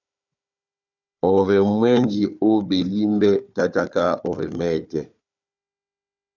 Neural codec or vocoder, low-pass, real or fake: codec, 16 kHz, 4 kbps, FunCodec, trained on Chinese and English, 50 frames a second; 7.2 kHz; fake